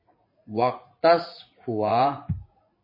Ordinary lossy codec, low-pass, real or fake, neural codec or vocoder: MP3, 24 kbps; 5.4 kHz; fake; vocoder, 44.1 kHz, 80 mel bands, Vocos